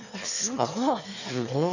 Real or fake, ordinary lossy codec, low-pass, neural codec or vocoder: fake; none; 7.2 kHz; autoencoder, 22.05 kHz, a latent of 192 numbers a frame, VITS, trained on one speaker